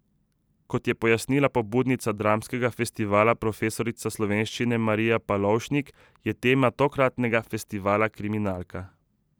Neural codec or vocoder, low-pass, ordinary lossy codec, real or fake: none; none; none; real